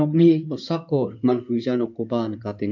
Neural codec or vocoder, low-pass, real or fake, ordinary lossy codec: codec, 16 kHz in and 24 kHz out, 2.2 kbps, FireRedTTS-2 codec; 7.2 kHz; fake; none